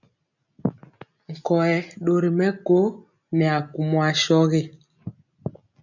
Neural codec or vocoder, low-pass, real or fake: none; 7.2 kHz; real